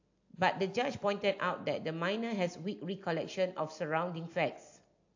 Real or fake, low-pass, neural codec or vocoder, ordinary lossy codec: real; 7.2 kHz; none; AAC, 48 kbps